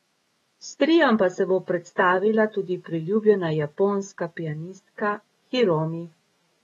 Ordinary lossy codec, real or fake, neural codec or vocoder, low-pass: AAC, 32 kbps; fake; autoencoder, 48 kHz, 128 numbers a frame, DAC-VAE, trained on Japanese speech; 19.8 kHz